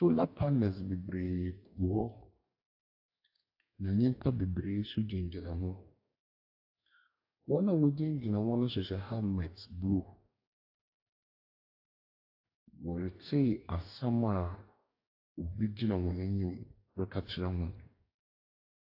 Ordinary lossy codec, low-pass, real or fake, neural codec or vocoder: AAC, 32 kbps; 5.4 kHz; fake; codec, 44.1 kHz, 2.6 kbps, DAC